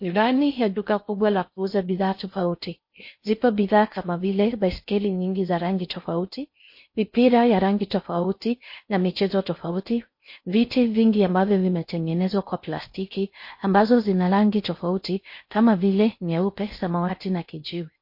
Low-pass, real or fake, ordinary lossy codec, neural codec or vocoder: 5.4 kHz; fake; MP3, 32 kbps; codec, 16 kHz in and 24 kHz out, 0.6 kbps, FocalCodec, streaming, 4096 codes